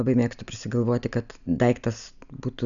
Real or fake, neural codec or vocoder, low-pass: real; none; 7.2 kHz